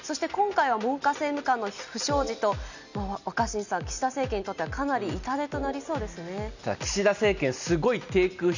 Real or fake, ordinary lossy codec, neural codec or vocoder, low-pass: real; none; none; 7.2 kHz